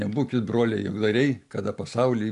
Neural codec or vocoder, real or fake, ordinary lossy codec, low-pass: none; real; AAC, 64 kbps; 10.8 kHz